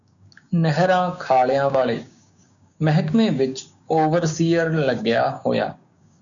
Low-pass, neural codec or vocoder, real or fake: 7.2 kHz; codec, 16 kHz, 6 kbps, DAC; fake